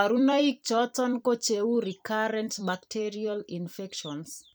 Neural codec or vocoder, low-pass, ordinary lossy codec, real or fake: vocoder, 44.1 kHz, 128 mel bands every 256 samples, BigVGAN v2; none; none; fake